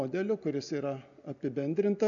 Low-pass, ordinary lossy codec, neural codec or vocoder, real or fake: 7.2 kHz; MP3, 96 kbps; none; real